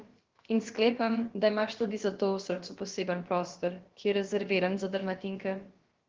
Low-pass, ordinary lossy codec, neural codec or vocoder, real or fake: 7.2 kHz; Opus, 16 kbps; codec, 16 kHz, about 1 kbps, DyCAST, with the encoder's durations; fake